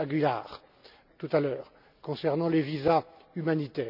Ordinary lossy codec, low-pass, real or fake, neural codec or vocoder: none; 5.4 kHz; real; none